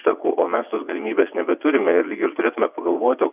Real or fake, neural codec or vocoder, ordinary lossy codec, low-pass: fake; vocoder, 22.05 kHz, 80 mel bands, WaveNeXt; AAC, 32 kbps; 3.6 kHz